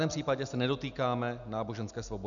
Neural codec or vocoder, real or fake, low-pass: none; real; 7.2 kHz